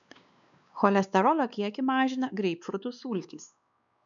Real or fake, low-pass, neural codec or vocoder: fake; 7.2 kHz; codec, 16 kHz, 2 kbps, X-Codec, WavLM features, trained on Multilingual LibriSpeech